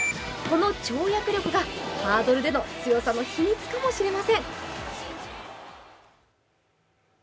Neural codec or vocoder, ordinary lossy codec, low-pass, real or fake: none; none; none; real